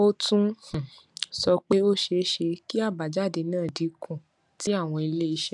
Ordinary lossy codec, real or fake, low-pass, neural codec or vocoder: none; real; 10.8 kHz; none